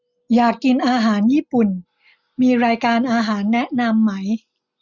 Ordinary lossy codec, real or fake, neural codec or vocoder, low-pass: none; real; none; 7.2 kHz